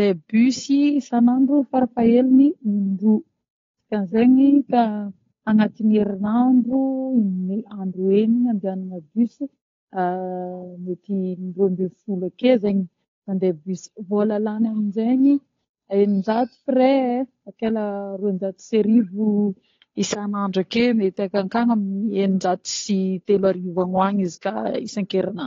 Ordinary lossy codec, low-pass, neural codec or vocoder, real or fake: AAC, 32 kbps; 7.2 kHz; codec, 16 kHz, 8 kbps, FunCodec, trained on Chinese and English, 25 frames a second; fake